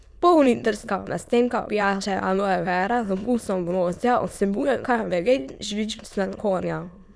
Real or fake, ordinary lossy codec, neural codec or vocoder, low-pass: fake; none; autoencoder, 22.05 kHz, a latent of 192 numbers a frame, VITS, trained on many speakers; none